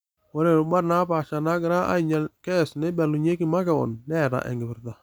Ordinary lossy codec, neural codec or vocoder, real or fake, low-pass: none; none; real; none